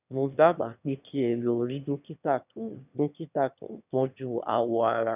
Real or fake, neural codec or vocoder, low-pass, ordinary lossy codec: fake; autoencoder, 22.05 kHz, a latent of 192 numbers a frame, VITS, trained on one speaker; 3.6 kHz; none